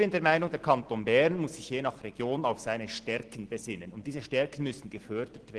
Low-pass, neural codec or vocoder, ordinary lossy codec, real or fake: 10.8 kHz; none; Opus, 16 kbps; real